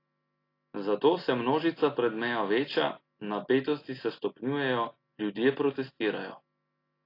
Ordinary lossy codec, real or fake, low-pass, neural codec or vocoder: AAC, 32 kbps; real; 5.4 kHz; none